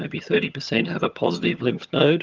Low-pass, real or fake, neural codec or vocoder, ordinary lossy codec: 7.2 kHz; fake; vocoder, 22.05 kHz, 80 mel bands, HiFi-GAN; Opus, 32 kbps